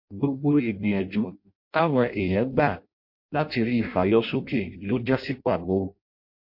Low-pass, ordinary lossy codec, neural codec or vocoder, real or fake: 5.4 kHz; MP3, 32 kbps; codec, 16 kHz in and 24 kHz out, 0.6 kbps, FireRedTTS-2 codec; fake